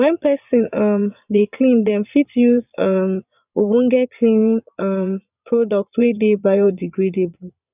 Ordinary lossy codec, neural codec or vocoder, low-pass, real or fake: none; vocoder, 22.05 kHz, 80 mel bands, Vocos; 3.6 kHz; fake